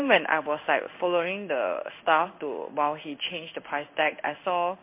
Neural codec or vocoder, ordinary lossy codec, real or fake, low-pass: codec, 16 kHz in and 24 kHz out, 1 kbps, XY-Tokenizer; MP3, 32 kbps; fake; 3.6 kHz